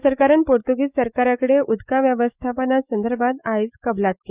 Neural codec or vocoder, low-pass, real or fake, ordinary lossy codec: autoencoder, 48 kHz, 128 numbers a frame, DAC-VAE, trained on Japanese speech; 3.6 kHz; fake; Opus, 64 kbps